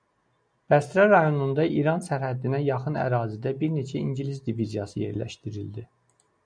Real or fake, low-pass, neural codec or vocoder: real; 9.9 kHz; none